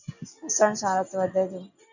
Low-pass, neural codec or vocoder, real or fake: 7.2 kHz; none; real